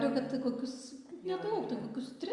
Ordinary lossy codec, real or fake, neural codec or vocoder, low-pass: Opus, 64 kbps; real; none; 10.8 kHz